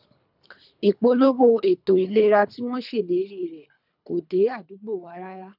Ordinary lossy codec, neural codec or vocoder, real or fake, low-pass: none; codec, 24 kHz, 3 kbps, HILCodec; fake; 5.4 kHz